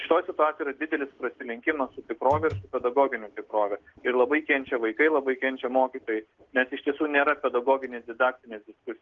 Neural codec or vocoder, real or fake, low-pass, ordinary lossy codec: none; real; 7.2 kHz; Opus, 16 kbps